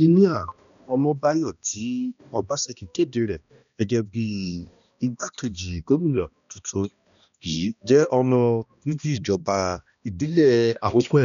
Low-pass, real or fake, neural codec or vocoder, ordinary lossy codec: 7.2 kHz; fake; codec, 16 kHz, 1 kbps, X-Codec, HuBERT features, trained on balanced general audio; none